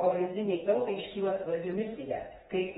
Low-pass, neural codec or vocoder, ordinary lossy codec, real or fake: 7.2 kHz; codec, 16 kHz, 2 kbps, FreqCodec, smaller model; AAC, 16 kbps; fake